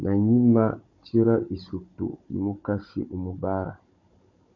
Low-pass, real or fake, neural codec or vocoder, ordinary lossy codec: 7.2 kHz; fake; codec, 16 kHz, 16 kbps, FunCodec, trained on Chinese and English, 50 frames a second; MP3, 48 kbps